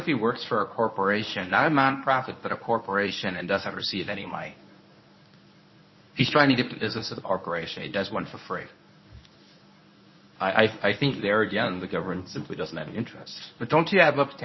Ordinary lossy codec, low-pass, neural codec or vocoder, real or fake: MP3, 24 kbps; 7.2 kHz; codec, 24 kHz, 0.9 kbps, WavTokenizer, medium speech release version 1; fake